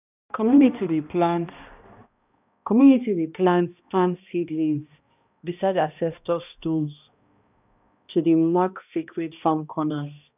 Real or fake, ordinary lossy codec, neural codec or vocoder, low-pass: fake; none; codec, 16 kHz, 1 kbps, X-Codec, HuBERT features, trained on balanced general audio; 3.6 kHz